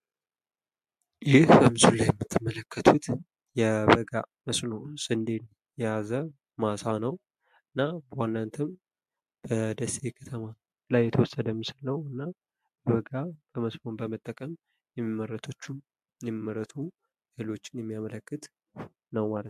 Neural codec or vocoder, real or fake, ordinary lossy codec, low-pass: none; real; MP3, 64 kbps; 14.4 kHz